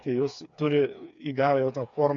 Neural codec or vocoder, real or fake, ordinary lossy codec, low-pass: codec, 16 kHz, 4 kbps, FreqCodec, smaller model; fake; MP3, 48 kbps; 7.2 kHz